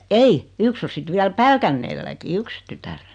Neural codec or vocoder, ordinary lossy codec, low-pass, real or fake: none; none; 9.9 kHz; real